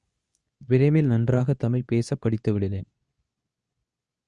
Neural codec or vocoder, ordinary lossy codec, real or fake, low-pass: codec, 24 kHz, 0.9 kbps, WavTokenizer, medium speech release version 2; Opus, 64 kbps; fake; 10.8 kHz